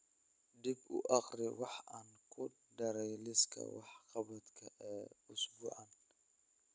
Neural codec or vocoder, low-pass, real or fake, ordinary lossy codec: none; none; real; none